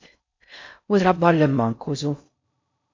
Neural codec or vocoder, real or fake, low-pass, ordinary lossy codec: codec, 16 kHz in and 24 kHz out, 0.6 kbps, FocalCodec, streaming, 4096 codes; fake; 7.2 kHz; MP3, 64 kbps